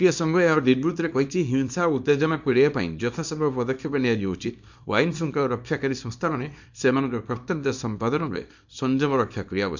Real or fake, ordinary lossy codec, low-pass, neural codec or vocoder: fake; MP3, 64 kbps; 7.2 kHz; codec, 24 kHz, 0.9 kbps, WavTokenizer, small release